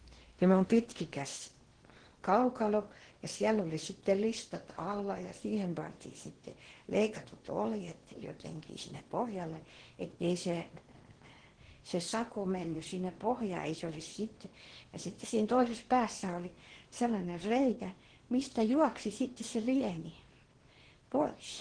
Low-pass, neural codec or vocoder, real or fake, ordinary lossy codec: 9.9 kHz; codec, 16 kHz in and 24 kHz out, 0.8 kbps, FocalCodec, streaming, 65536 codes; fake; Opus, 16 kbps